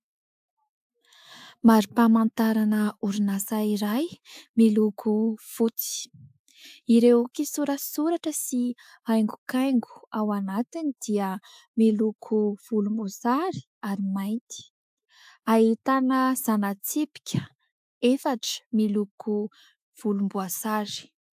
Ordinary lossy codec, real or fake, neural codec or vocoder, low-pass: AAC, 96 kbps; fake; autoencoder, 48 kHz, 128 numbers a frame, DAC-VAE, trained on Japanese speech; 14.4 kHz